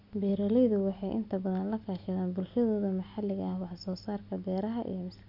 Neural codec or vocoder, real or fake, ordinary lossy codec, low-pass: none; real; none; 5.4 kHz